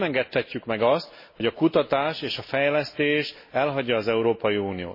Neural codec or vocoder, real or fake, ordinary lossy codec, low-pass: none; real; MP3, 24 kbps; 5.4 kHz